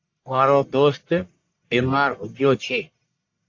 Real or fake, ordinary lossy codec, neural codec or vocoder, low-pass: fake; AAC, 48 kbps; codec, 44.1 kHz, 1.7 kbps, Pupu-Codec; 7.2 kHz